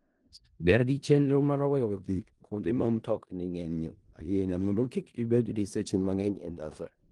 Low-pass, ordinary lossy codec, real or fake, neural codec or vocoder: 10.8 kHz; Opus, 24 kbps; fake; codec, 16 kHz in and 24 kHz out, 0.4 kbps, LongCat-Audio-Codec, four codebook decoder